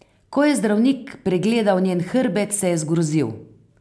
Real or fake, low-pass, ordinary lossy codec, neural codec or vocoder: real; none; none; none